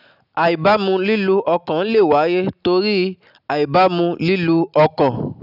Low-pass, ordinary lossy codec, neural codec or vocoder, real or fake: 5.4 kHz; none; none; real